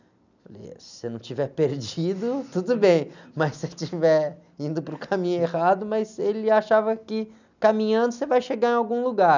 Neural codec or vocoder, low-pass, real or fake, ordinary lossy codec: none; 7.2 kHz; real; none